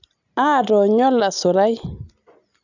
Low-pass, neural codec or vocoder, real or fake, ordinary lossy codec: 7.2 kHz; none; real; none